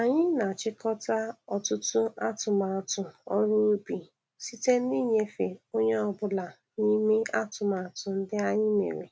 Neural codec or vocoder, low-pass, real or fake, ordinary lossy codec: none; none; real; none